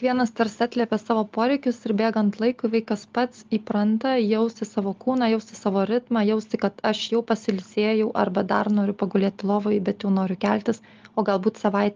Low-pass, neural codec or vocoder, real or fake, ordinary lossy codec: 7.2 kHz; none; real; Opus, 32 kbps